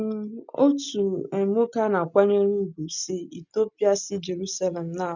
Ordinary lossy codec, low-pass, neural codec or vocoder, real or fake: none; 7.2 kHz; none; real